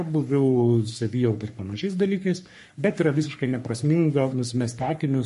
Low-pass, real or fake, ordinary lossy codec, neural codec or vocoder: 14.4 kHz; fake; MP3, 48 kbps; codec, 44.1 kHz, 3.4 kbps, Pupu-Codec